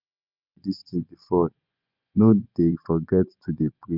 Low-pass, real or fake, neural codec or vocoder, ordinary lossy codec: 5.4 kHz; fake; vocoder, 24 kHz, 100 mel bands, Vocos; none